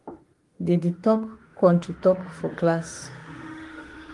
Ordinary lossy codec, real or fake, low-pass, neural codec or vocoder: Opus, 24 kbps; fake; 10.8 kHz; autoencoder, 48 kHz, 32 numbers a frame, DAC-VAE, trained on Japanese speech